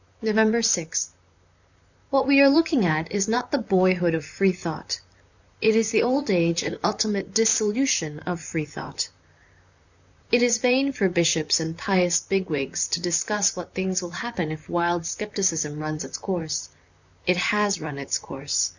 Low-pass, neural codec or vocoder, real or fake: 7.2 kHz; vocoder, 44.1 kHz, 128 mel bands, Pupu-Vocoder; fake